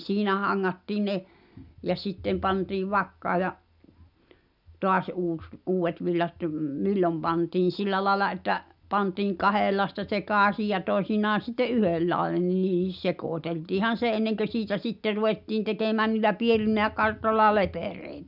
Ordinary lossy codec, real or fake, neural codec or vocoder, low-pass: none; real; none; 5.4 kHz